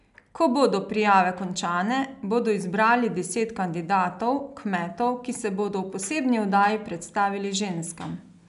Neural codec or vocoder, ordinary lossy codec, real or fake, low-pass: none; none; real; 10.8 kHz